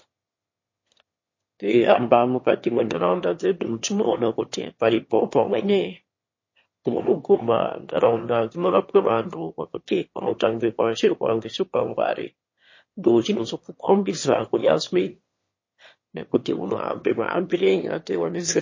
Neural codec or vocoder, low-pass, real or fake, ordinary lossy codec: autoencoder, 22.05 kHz, a latent of 192 numbers a frame, VITS, trained on one speaker; 7.2 kHz; fake; MP3, 32 kbps